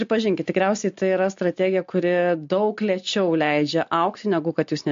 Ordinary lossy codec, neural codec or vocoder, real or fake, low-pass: MP3, 48 kbps; none; real; 7.2 kHz